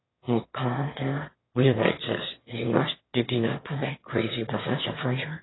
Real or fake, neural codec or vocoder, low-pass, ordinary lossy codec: fake; autoencoder, 22.05 kHz, a latent of 192 numbers a frame, VITS, trained on one speaker; 7.2 kHz; AAC, 16 kbps